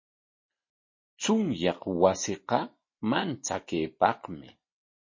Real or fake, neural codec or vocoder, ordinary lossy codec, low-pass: real; none; MP3, 32 kbps; 7.2 kHz